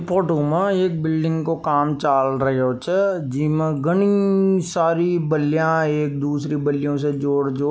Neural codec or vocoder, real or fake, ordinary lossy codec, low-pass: none; real; none; none